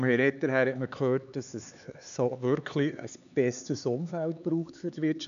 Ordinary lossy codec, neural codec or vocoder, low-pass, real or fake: none; codec, 16 kHz, 4 kbps, X-Codec, HuBERT features, trained on LibriSpeech; 7.2 kHz; fake